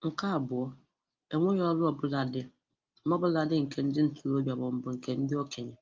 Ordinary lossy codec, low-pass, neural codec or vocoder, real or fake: Opus, 32 kbps; 7.2 kHz; none; real